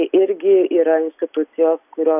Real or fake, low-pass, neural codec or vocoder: real; 3.6 kHz; none